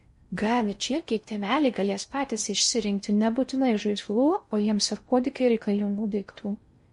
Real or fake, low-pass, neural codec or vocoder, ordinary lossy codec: fake; 10.8 kHz; codec, 16 kHz in and 24 kHz out, 0.6 kbps, FocalCodec, streaming, 4096 codes; MP3, 48 kbps